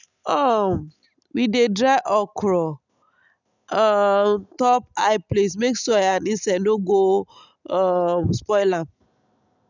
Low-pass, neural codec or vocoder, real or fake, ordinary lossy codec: 7.2 kHz; none; real; none